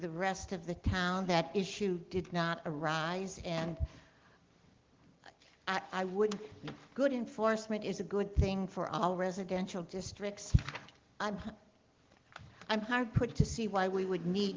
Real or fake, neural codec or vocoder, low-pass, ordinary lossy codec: real; none; 7.2 kHz; Opus, 16 kbps